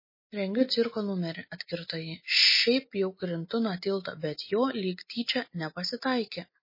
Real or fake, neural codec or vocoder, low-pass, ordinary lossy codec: real; none; 5.4 kHz; MP3, 24 kbps